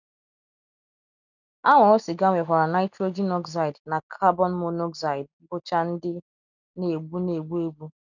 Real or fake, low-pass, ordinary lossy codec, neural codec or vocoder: real; 7.2 kHz; none; none